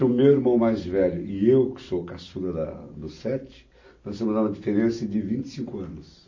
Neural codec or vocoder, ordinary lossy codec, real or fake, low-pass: none; MP3, 32 kbps; real; 7.2 kHz